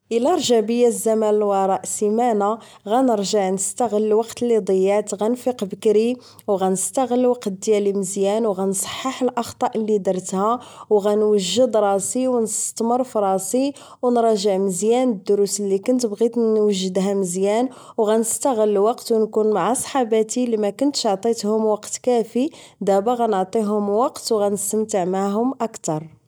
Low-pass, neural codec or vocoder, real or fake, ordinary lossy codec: none; none; real; none